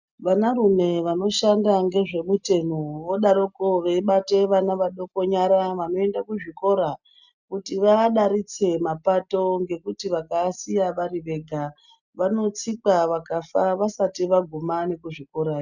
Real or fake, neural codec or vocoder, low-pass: real; none; 7.2 kHz